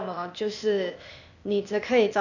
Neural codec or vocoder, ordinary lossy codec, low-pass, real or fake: codec, 16 kHz, 0.8 kbps, ZipCodec; none; 7.2 kHz; fake